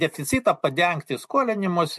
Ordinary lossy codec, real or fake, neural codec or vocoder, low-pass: MP3, 64 kbps; real; none; 14.4 kHz